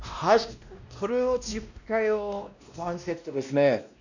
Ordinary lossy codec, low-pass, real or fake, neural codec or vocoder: none; 7.2 kHz; fake; codec, 16 kHz, 1 kbps, X-Codec, WavLM features, trained on Multilingual LibriSpeech